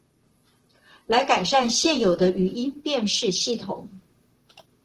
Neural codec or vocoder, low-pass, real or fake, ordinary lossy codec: vocoder, 44.1 kHz, 128 mel bands, Pupu-Vocoder; 14.4 kHz; fake; Opus, 16 kbps